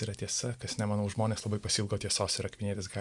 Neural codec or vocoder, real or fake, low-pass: none; real; 10.8 kHz